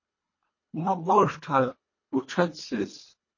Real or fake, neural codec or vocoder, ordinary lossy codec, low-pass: fake; codec, 24 kHz, 1.5 kbps, HILCodec; MP3, 32 kbps; 7.2 kHz